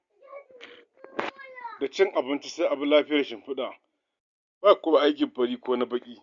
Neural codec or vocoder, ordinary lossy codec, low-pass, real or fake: none; Opus, 64 kbps; 7.2 kHz; real